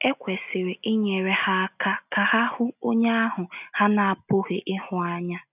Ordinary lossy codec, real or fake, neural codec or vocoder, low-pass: none; real; none; 3.6 kHz